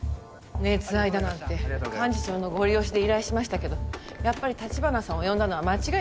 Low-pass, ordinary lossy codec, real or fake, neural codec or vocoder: none; none; real; none